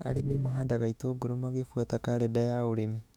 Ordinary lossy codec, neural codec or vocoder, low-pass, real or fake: none; autoencoder, 48 kHz, 32 numbers a frame, DAC-VAE, trained on Japanese speech; 19.8 kHz; fake